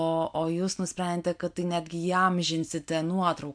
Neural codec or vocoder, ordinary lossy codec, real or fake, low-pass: none; MP3, 64 kbps; real; 9.9 kHz